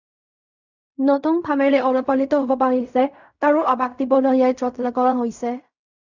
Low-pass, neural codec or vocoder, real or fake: 7.2 kHz; codec, 16 kHz in and 24 kHz out, 0.4 kbps, LongCat-Audio-Codec, fine tuned four codebook decoder; fake